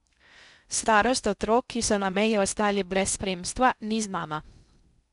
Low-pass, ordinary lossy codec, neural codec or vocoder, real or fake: 10.8 kHz; none; codec, 16 kHz in and 24 kHz out, 0.6 kbps, FocalCodec, streaming, 2048 codes; fake